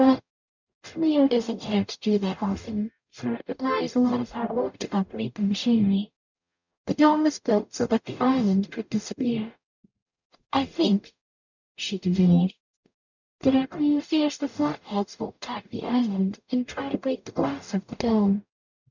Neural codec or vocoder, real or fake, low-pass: codec, 44.1 kHz, 0.9 kbps, DAC; fake; 7.2 kHz